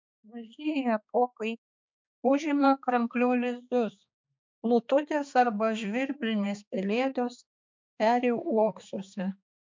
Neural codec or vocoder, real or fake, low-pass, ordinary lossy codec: codec, 16 kHz, 2 kbps, X-Codec, HuBERT features, trained on balanced general audio; fake; 7.2 kHz; MP3, 64 kbps